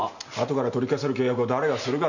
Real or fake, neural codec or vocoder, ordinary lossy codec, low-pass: real; none; none; 7.2 kHz